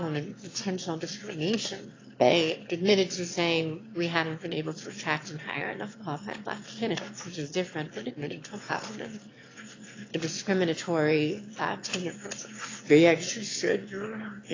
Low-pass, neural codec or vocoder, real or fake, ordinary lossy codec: 7.2 kHz; autoencoder, 22.05 kHz, a latent of 192 numbers a frame, VITS, trained on one speaker; fake; AAC, 32 kbps